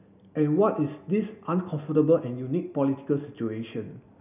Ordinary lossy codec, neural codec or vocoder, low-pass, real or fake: none; none; 3.6 kHz; real